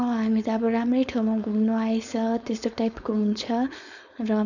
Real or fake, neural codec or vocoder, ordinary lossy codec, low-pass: fake; codec, 16 kHz, 4.8 kbps, FACodec; none; 7.2 kHz